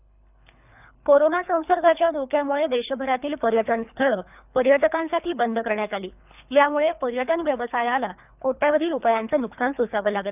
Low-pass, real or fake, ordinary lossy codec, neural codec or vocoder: 3.6 kHz; fake; none; codec, 24 kHz, 3 kbps, HILCodec